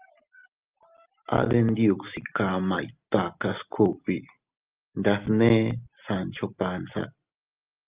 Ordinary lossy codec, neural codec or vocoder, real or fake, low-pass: Opus, 24 kbps; none; real; 3.6 kHz